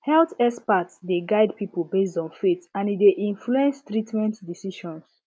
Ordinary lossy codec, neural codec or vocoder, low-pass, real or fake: none; none; none; real